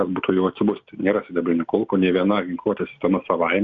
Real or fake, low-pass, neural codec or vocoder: real; 7.2 kHz; none